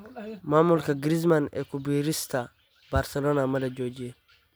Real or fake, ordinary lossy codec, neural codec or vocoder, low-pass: real; none; none; none